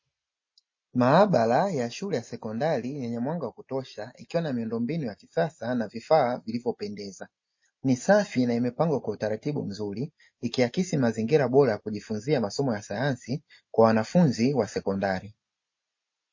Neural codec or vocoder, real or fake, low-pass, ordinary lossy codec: none; real; 7.2 kHz; MP3, 32 kbps